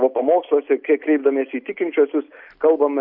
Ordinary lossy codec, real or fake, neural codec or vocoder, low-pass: AAC, 48 kbps; real; none; 5.4 kHz